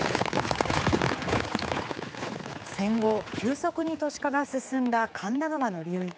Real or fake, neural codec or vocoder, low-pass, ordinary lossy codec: fake; codec, 16 kHz, 4 kbps, X-Codec, HuBERT features, trained on general audio; none; none